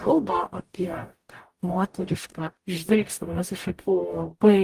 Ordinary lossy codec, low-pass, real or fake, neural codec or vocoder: Opus, 24 kbps; 14.4 kHz; fake; codec, 44.1 kHz, 0.9 kbps, DAC